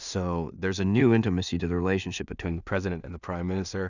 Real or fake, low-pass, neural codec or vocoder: fake; 7.2 kHz; codec, 16 kHz in and 24 kHz out, 0.4 kbps, LongCat-Audio-Codec, two codebook decoder